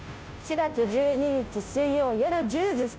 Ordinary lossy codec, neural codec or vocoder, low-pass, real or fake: none; codec, 16 kHz, 0.5 kbps, FunCodec, trained on Chinese and English, 25 frames a second; none; fake